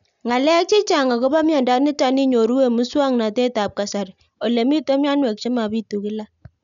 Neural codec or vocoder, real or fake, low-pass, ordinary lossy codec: none; real; 7.2 kHz; none